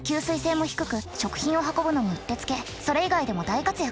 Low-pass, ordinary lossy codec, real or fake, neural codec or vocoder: none; none; real; none